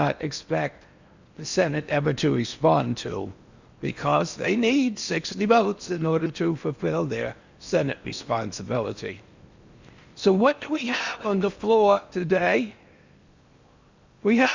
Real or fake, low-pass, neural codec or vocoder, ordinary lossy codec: fake; 7.2 kHz; codec, 16 kHz in and 24 kHz out, 0.8 kbps, FocalCodec, streaming, 65536 codes; Opus, 64 kbps